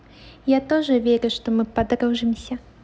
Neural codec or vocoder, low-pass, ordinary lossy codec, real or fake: none; none; none; real